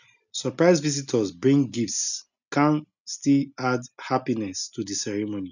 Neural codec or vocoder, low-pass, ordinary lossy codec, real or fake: none; 7.2 kHz; none; real